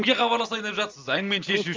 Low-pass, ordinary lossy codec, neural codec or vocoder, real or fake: 7.2 kHz; Opus, 32 kbps; none; real